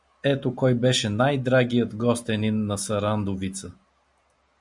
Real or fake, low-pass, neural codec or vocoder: real; 10.8 kHz; none